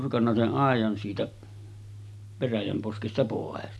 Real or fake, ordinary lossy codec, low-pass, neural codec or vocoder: real; none; none; none